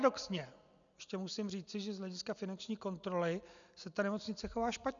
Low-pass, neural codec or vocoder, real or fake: 7.2 kHz; none; real